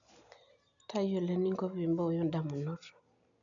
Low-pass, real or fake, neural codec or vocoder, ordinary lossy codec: 7.2 kHz; real; none; none